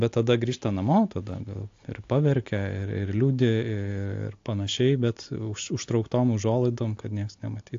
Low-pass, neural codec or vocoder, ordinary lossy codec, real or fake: 7.2 kHz; none; AAC, 48 kbps; real